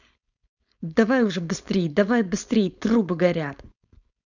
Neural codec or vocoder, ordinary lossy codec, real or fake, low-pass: codec, 16 kHz, 4.8 kbps, FACodec; none; fake; 7.2 kHz